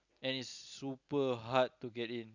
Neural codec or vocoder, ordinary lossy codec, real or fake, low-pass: none; none; real; 7.2 kHz